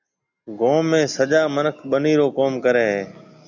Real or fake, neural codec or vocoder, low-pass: real; none; 7.2 kHz